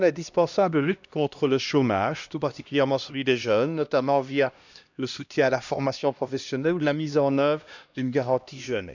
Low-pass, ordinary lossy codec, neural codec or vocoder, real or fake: 7.2 kHz; none; codec, 16 kHz, 1 kbps, X-Codec, HuBERT features, trained on LibriSpeech; fake